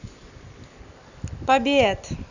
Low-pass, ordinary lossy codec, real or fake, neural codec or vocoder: 7.2 kHz; none; real; none